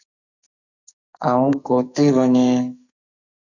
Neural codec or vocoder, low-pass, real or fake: codec, 44.1 kHz, 2.6 kbps, SNAC; 7.2 kHz; fake